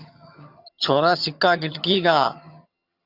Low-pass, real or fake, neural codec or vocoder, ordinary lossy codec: 5.4 kHz; fake; vocoder, 22.05 kHz, 80 mel bands, HiFi-GAN; Opus, 64 kbps